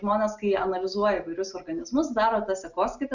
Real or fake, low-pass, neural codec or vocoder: real; 7.2 kHz; none